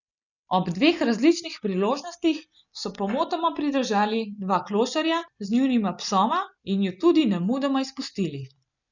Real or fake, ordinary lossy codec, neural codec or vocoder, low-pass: real; none; none; 7.2 kHz